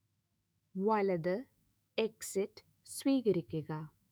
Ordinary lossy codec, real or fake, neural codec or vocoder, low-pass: none; fake; autoencoder, 48 kHz, 128 numbers a frame, DAC-VAE, trained on Japanese speech; none